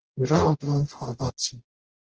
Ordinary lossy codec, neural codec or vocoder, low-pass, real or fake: Opus, 16 kbps; codec, 44.1 kHz, 0.9 kbps, DAC; 7.2 kHz; fake